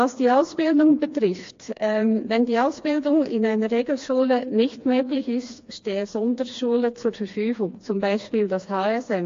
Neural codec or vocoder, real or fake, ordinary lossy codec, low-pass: codec, 16 kHz, 2 kbps, FreqCodec, smaller model; fake; AAC, 48 kbps; 7.2 kHz